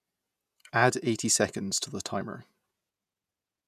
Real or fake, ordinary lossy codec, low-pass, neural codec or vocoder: fake; none; 14.4 kHz; vocoder, 48 kHz, 128 mel bands, Vocos